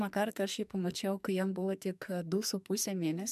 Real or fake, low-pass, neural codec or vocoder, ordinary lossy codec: fake; 14.4 kHz; codec, 44.1 kHz, 2.6 kbps, SNAC; MP3, 96 kbps